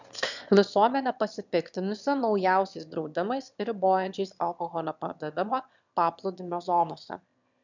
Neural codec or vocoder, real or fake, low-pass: autoencoder, 22.05 kHz, a latent of 192 numbers a frame, VITS, trained on one speaker; fake; 7.2 kHz